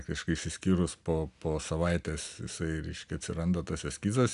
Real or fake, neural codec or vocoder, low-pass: real; none; 10.8 kHz